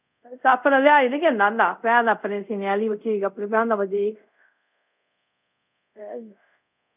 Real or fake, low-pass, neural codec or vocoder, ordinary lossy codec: fake; 3.6 kHz; codec, 24 kHz, 0.5 kbps, DualCodec; none